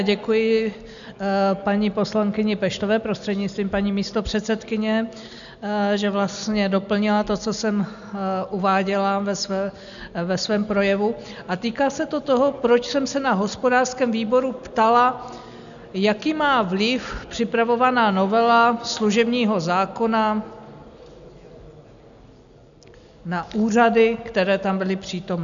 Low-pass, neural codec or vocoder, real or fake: 7.2 kHz; none; real